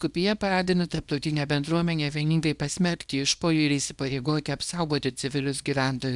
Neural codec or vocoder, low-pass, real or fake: codec, 24 kHz, 0.9 kbps, WavTokenizer, small release; 10.8 kHz; fake